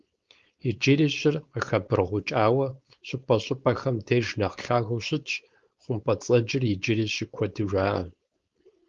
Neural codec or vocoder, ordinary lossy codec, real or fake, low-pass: codec, 16 kHz, 4.8 kbps, FACodec; Opus, 32 kbps; fake; 7.2 kHz